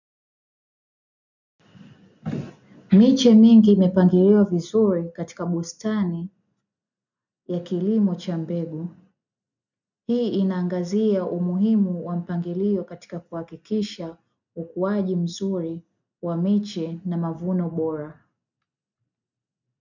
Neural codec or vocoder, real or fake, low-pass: none; real; 7.2 kHz